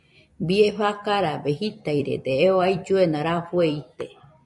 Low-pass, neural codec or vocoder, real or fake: 10.8 kHz; vocoder, 44.1 kHz, 128 mel bands every 256 samples, BigVGAN v2; fake